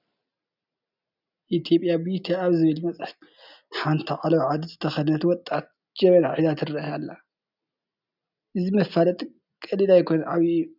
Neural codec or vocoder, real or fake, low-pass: none; real; 5.4 kHz